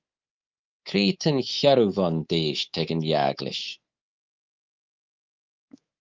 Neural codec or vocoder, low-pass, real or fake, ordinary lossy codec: codec, 24 kHz, 3.1 kbps, DualCodec; 7.2 kHz; fake; Opus, 24 kbps